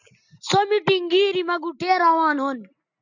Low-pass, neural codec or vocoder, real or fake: 7.2 kHz; none; real